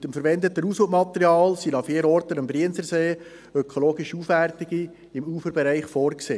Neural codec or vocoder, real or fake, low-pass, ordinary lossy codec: none; real; none; none